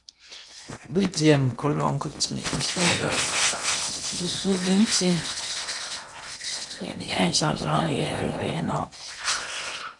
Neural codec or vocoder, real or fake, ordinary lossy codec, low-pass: codec, 16 kHz in and 24 kHz out, 0.8 kbps, FocalCodec, streaming, 65536 codes; fake; MP3, 96 kbps; 10.8 kHz